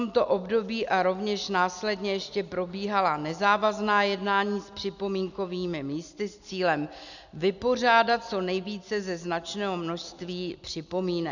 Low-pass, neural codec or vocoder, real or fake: 7.2 kHz; none; real